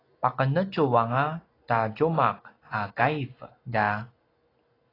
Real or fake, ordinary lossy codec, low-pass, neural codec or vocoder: real; AAC, 24 kbps; 5.4 kHz; none